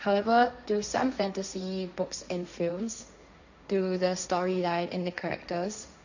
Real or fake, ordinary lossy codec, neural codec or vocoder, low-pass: fake; none; codec, 16 kHz, 1.1 kbps, Voila-Tokenizer; 7.2 kHz